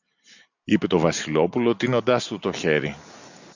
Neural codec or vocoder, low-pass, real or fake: none; 7.2 kHz; real